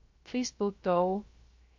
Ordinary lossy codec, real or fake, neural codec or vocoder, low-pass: MP3, 48 kbps; fake; codec, 16 kHz, 0.3 kbps, FocalCodec; 7.2 kHz